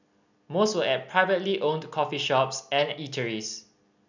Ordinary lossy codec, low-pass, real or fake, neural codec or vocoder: none; 7.2 kHz; real; none